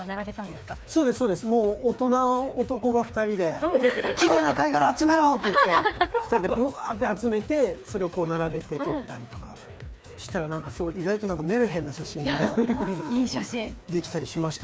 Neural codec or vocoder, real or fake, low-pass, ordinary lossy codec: codec, 16 kHz, 2 kbps, FreqCodec, larger model; fake; none; none